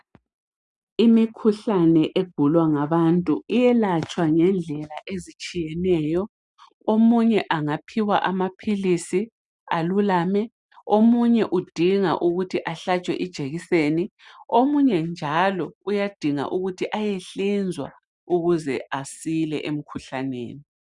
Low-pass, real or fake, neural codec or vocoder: 10.8 kHz; real; none